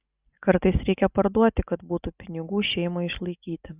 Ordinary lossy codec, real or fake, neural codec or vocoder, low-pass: Opus, 64 kbps; real; none; 3.6 kHz